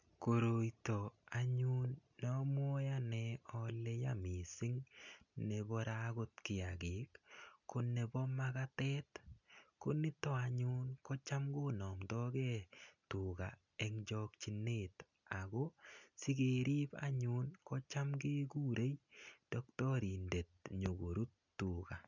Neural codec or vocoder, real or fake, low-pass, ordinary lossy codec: none; real; 7.2 kHz; none